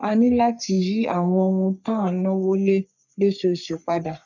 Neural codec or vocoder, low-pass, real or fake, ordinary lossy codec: codec, 44.1 kHz, 3.4 kbps, Pupu-Codec; 7.2 kHz; fake; none